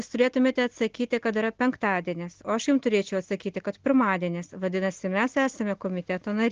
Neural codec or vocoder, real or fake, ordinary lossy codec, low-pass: none; real; Opus, 16 kbps; 7.2 kHz